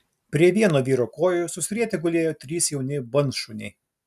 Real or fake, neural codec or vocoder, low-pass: real; none; 14.4 kHz